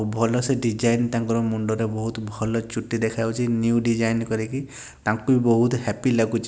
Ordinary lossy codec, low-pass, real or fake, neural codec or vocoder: none; none; real; none